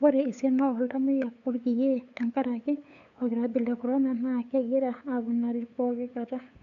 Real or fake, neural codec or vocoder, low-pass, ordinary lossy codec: fake; codec, 16 kHz, 8 kbps, FunCodec, trained on LibriTTS, 25 frames a second; 7.2 kHz; none